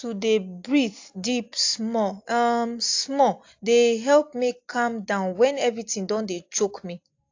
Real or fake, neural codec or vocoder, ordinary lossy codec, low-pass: real; none; AAC, 48 kbps; 7.2 kHz